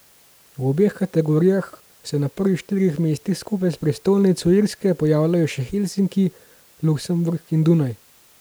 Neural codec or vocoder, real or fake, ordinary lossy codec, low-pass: none; real; none; none